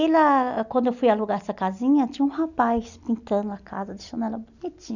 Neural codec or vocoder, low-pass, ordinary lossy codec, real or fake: none; 7.2 kHz; none; real